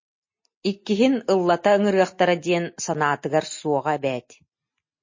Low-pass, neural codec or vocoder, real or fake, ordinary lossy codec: 7.2 kHz; none; real; MP3, 32 kbps